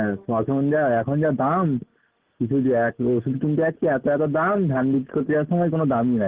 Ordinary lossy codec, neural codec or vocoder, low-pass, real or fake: Opus, 16 kbps; none; 3.6 kHz; real